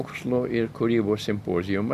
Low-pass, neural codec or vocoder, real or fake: 14.4 kHz; none; real